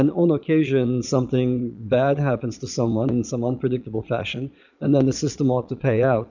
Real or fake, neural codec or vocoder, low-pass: fake; vocoder, 22.05 kHz, 80 mel bands, Vocos; 7.2 kHz